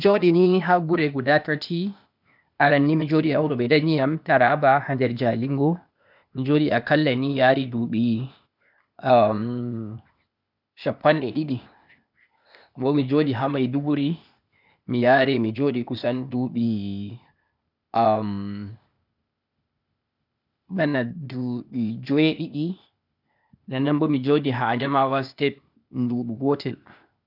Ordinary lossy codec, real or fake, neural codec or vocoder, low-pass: none; fake; codec, 16 kHz, 0.8 kbps, ZipCodec; 5.4 kHz